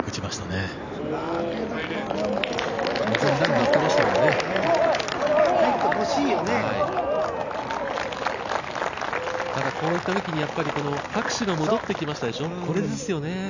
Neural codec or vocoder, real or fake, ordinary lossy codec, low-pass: none; real; none; 7.2 kHz